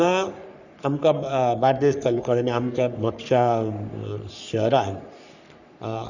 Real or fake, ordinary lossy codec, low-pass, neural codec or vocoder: fake; none; 7.2 kHz; codec, 44.1 kHz, 3.4 kbps, Pupu-Codec